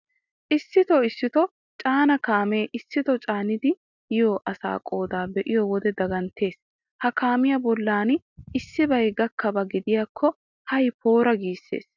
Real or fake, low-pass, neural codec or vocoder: real; 7.2 kHz; none